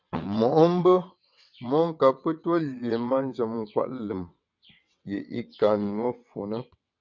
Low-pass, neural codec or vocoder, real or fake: 7.2 kHz; vocoder, 22.05 kHz, 80 mel bands, WaveNeXt; fake